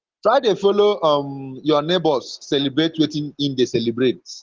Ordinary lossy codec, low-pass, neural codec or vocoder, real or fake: Opus, 16 kbps; 7.2 kHz; none; real